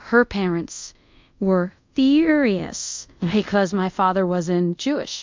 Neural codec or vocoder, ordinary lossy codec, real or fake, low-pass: codec, 24 kHz, 0.5 kbps, DualCodec; MP3, 64 kbps; fake; 7.2 kHz